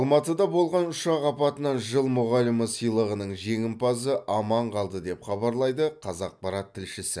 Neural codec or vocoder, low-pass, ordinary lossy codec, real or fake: none; none; none; real